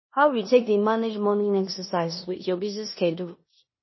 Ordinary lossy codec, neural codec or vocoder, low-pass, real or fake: MP3, 24 kbps; codec, 16 kHz in and 24 kHz out, 0.9 kbps, LongCat-Audio-Codec, four codebook decoder; 7.2 kHz; fake